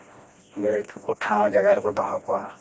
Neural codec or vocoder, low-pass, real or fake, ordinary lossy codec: codec, 16 kHz, 1 kbps, FreqCodec, smaller model; none; fake; none